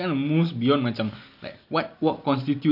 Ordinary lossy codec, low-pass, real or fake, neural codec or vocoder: none; 5.4 kHz; real; none